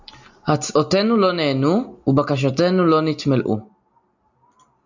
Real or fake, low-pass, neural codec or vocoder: real; 7.2 kHz; none